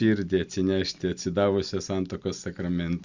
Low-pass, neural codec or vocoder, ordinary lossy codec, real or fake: 7.2 kHz; none; Opus, 64 kbps; real